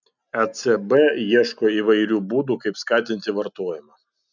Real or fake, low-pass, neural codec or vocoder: real; 7.2 kHz; none